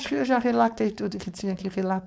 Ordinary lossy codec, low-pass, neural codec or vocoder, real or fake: none; none; codec, 16 kHz, 4.8 kbps, FACodec; fake